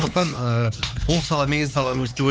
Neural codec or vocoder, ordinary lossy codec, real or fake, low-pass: codec, 16 kHz, 2 kbps, X-Codec, HuBERT features, trained on LibriSpeech; none; fake; none